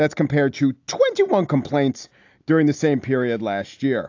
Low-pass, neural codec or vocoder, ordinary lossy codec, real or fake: 7.2 kHz; none; AAC, 48 kbps; real